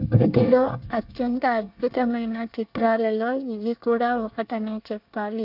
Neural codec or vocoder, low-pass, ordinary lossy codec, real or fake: codec, 24 kHz, 1 kbps, SNAC; 5.4 kHz; AAC, 48 kbps; fake